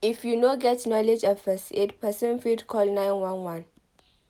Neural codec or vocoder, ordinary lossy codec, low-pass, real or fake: none; none; 19.8 kHz; real